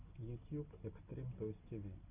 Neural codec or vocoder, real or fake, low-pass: none; real; 3.6 kHz